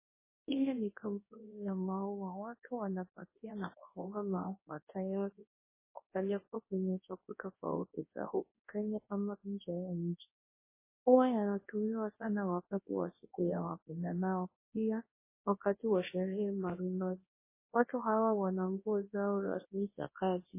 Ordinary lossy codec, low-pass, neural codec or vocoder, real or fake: MP3, 16 kbps; 3.6 kHz; codec, 24 kHz, 0.9 kbps, WavTokenizer, large speech release; fake